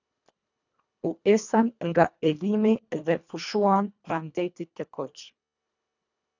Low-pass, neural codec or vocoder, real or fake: 7.2 kHz; codec, 24 kHz, 1.5 kbps, HILCodec; fake